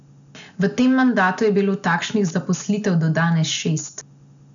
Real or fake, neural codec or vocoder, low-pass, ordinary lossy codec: real; none; 7.2 kHz; none